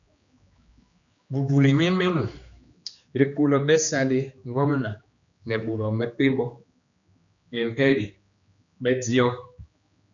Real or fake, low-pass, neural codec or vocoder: fake; 7.2 kHz; codec, 16 kHz, 2 kbps, X-Codec, HuBERT features, trained on general audio